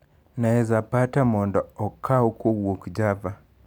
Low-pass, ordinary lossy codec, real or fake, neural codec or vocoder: none; none; real; none